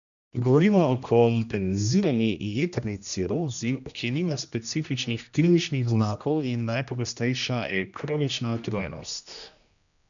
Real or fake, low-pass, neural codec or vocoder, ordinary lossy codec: fake; 7.2 kHz; codec, 16 kHz, 1 kbps, X-Codec, HuBERT features, trained on general audio; none